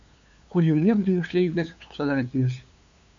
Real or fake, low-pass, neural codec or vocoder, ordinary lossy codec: fake; 7.2 kHz; codec, 16 kHz, 2 kbps, FunCodec, trained on LibriTTS, 25 frames a second; MP3, 64 kbps